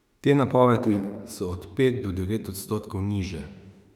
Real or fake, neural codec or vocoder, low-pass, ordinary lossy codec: fake; autoencoder, 48 kHz, 32 numbers a frame, DAC-VAE, trained on Japanese speech; 19.8 kHz; none